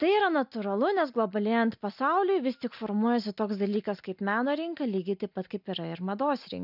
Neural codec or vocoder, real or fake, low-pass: none; real; 5.4 kHz